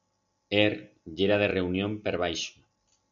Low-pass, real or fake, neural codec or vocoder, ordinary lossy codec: 7.2 kHz; real; none; MP3, 96 kbps